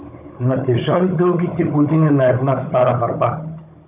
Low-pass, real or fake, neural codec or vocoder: 3.6 kHz; fake; codec, 16 kHz, 16 kbps, FunCodec, trained on Chinese and English, 50 frames a second